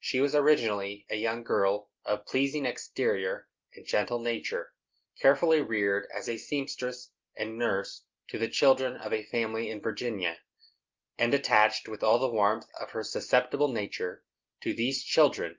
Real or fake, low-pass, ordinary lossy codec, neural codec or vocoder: real; 7.2 kHz; Opus, 32 kbps; none